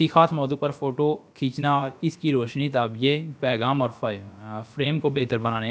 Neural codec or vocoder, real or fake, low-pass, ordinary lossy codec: codec, 16 kHz, about 1 kbps, DyCAST, with the encoder's durations; fake; none; none